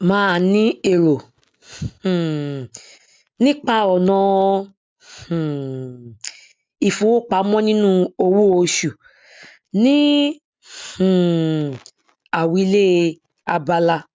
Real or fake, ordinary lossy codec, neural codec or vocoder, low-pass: real; none; none; none